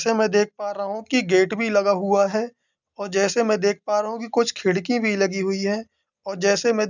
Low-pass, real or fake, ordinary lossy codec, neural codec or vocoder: 7.2 kHz; real; none; none